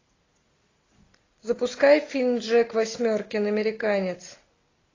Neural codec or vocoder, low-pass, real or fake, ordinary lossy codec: none; 7.2 kHz; real; AAC, 32 kbps